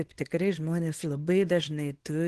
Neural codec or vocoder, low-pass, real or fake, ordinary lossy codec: codec, 24 kHz, 0.9 kbps, WavTokenizer, small release; 10.8 kHz; fake; Opus, 16 kbps